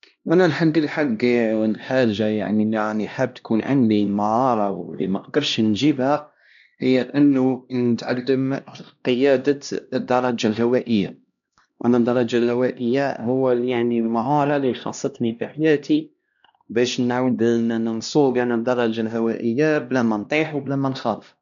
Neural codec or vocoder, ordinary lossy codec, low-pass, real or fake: codec, 16 kHz, 1 kbps, X-Codec, HuBERT features, trained on LibriSpeech; none; 7.2 kHz; fake